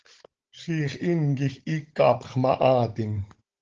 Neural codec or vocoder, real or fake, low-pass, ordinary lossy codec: codec, 16 kHz, 16 kbps, FunCodec, trained on Chinese and English, 50 frames a second; fake; 7.2 kHz; Opus, 16 kbps